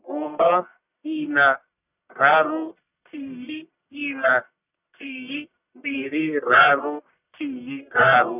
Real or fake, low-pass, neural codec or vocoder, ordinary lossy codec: fake; 3.6 kHz; codec, 44.1 kHz, 1.7 kbps, Pupu-Codec; none